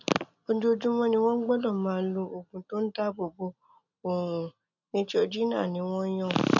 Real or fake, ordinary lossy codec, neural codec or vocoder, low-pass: real; none; none; 7.2 kHz